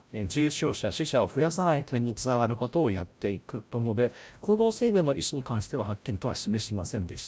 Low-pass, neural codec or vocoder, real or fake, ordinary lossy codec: none; codec, 16 kHz, 0.5 kbps, FreqCodec, larger model; fake; none